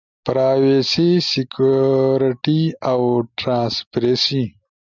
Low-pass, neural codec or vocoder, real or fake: 7.2 kHz; none; real